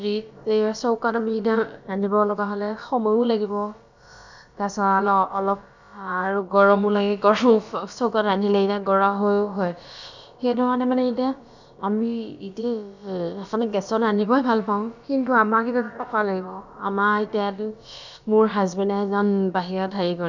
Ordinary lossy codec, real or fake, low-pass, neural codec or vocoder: none; fake; 7.2 kHz; codec, 16 kHz, about 1 kbps, DyCAST, with the encoder's durations